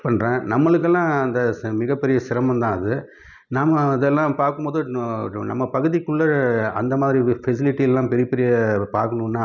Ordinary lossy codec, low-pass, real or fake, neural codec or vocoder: none; 7.2 kHz; real; none